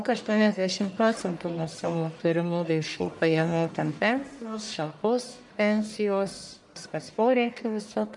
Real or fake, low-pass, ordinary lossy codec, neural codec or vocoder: fake; 10.8 kHz; MP3, 96 kbps; codec, 44.1 kHz, 1.7 kbps, Pupu-Codec